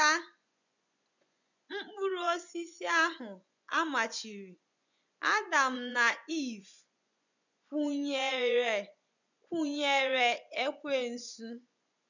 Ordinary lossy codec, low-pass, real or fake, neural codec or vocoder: none; 7.2 kHz; fake; vocoder, 24 kHz, 100 mel bands, Vocos